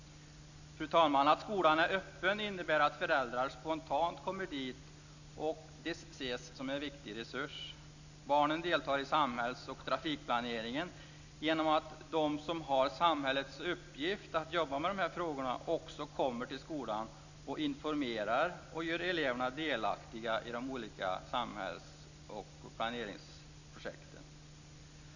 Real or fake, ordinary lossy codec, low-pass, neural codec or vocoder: real; none; 7.2 kHz; none